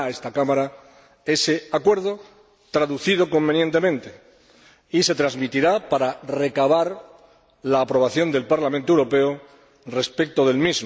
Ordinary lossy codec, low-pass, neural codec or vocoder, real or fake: none; none; none; real